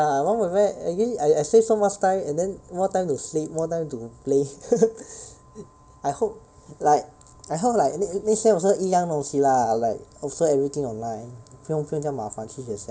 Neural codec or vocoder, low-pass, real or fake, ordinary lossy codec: none; none; real; none